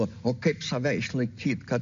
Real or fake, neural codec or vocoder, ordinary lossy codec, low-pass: real; none; MP3, 48 kbps; 7.2 kHz